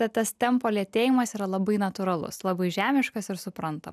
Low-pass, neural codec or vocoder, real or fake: 14.4 kHz; none; real